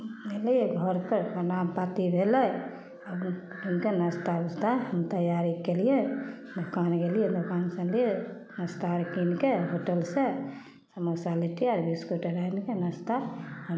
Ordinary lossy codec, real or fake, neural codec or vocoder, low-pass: none; real; none; none